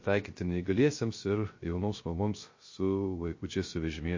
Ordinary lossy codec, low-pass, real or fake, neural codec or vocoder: MP3, 32 kbps; 7.2 kHz; fake; codec, 16 kHz, 0.3 kbps, FocalCodec